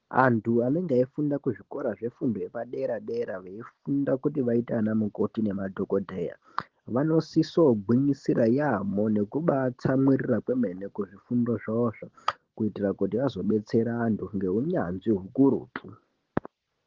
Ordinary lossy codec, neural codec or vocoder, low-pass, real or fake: Opus, 16 kbps; none; 7.2 kHz; real